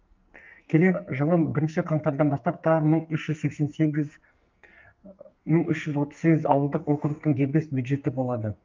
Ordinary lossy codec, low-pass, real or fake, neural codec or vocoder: Opus, 24 kbps; 7.2 kHz; fake; codec, 44.1 kHz, 2.6 kbps, SNAC